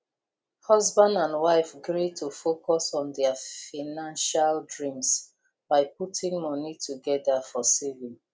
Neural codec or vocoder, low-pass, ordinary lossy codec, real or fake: none; none; none; real